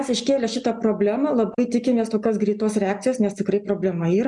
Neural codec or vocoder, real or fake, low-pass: none; real; 10.8 kHz